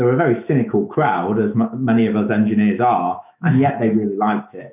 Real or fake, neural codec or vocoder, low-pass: fake; autoencoder, 48 kHz, 128 numbers a frame, DAC-VAE, trained on Japanese speech; 3.6 kHz